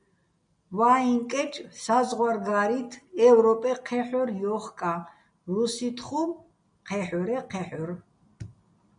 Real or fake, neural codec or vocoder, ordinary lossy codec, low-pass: real; none; MP3, 96 kbps; 9.9 kHz